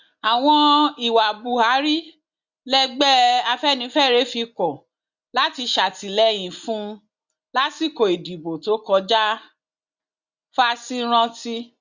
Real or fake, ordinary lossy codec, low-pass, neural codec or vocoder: real; Opus, 64 kbps; 7.2 kHz; none